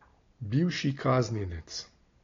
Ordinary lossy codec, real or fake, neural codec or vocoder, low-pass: AAC, 32 kbps; real; none; 7.2 kHz